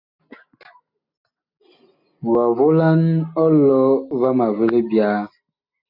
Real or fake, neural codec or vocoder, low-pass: real; none; 5.4 kHz